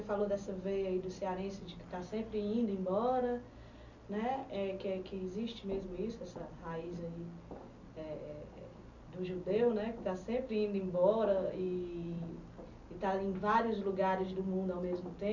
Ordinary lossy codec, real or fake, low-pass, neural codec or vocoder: none; real; 7.2 kHz; none